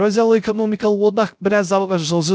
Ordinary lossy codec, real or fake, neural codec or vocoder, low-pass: none; fake; codec, 16 kHz, 0.3 kbps, FocalCodec; none